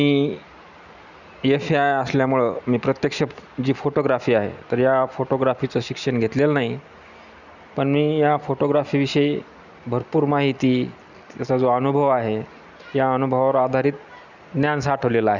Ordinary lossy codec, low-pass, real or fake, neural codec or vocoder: none; 7.2 kHz; real; none